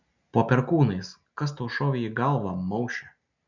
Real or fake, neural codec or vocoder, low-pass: real; none; 7.2 kHz